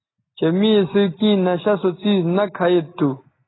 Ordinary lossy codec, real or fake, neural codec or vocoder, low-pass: AAC, 16 kbps; real; none; 7.2 kHz